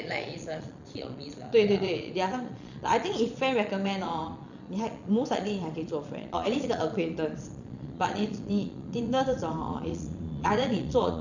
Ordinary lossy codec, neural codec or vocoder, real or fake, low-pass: none; vocoder, 22.05 kHz, 80 mel bands, Vocos; fake; 7.2 kHz